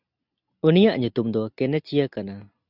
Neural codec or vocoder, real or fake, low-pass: none; real; 5.4 kHz